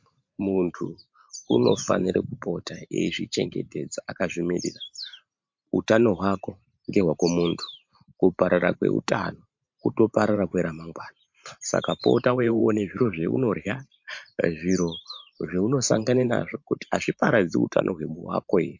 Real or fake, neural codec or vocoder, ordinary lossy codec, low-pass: fake; vocoder, 44.1 kHz, 128 mel bands every 512 samples, BigVGAN v2; MP3, 48 kbps; 7.2 kHz